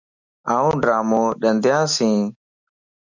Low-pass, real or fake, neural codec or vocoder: 7.2 kHz; real; none